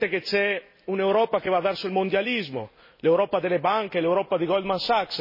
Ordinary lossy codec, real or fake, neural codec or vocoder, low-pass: MP3, 24 kbps; real; none; 5.4 kHz